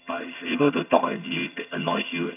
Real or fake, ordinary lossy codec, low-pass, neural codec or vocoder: fake; none; 3.6 kHz; vocoder, 22.05 kHz, 80 mel bands, HiFi-GAN